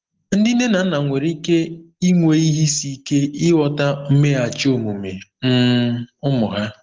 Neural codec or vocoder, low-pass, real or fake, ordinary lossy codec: none; 7.2 kHz; real; Opus, 16 kbps